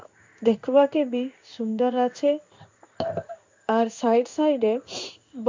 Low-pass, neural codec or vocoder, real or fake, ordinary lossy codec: 7.2 kHz; codec, 16 kHz in and 24 kHz out, 1 kbps, XY-Tokenizer; fake; MP3, 64 kbps